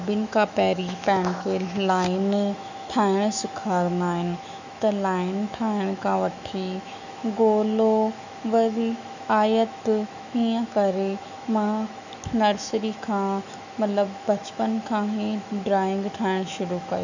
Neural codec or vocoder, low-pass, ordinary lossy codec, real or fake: autoencoder, 48 kHz, 128 numbers a frame, DAC-VAE, trained on Japanese speech; 7.2 kHz; none; fake